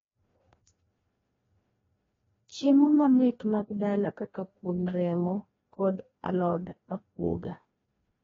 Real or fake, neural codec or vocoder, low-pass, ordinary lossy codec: fake; codec, 16 kHz, 1 kbps, FreqCodec, larger model; 7.2 kHz; AAC, 24 kbps